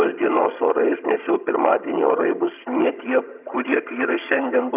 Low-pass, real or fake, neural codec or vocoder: 3.6 kHz; fake; vocoder, 22.05 kHz, 80 mel bands, HiFi-GAN